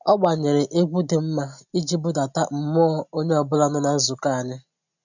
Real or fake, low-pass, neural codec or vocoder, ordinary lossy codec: real; 7.2 kHz; none; none